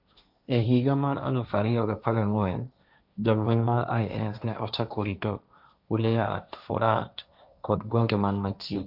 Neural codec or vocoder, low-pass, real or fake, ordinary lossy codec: codec, 16 kHz, 1.1 kbps, Voila-Tokenizer; 5.4 kHz; fake; none